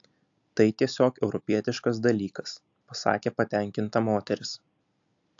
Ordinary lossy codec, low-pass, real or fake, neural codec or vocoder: AAC, 64 kbps; 7.2 kHz; real; none